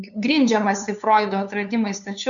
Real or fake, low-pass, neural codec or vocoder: fake; 7.2 kHz; codec, 16 kHz, 2 kbps, FunCodec, trained on LibriTTS, 25 frames a second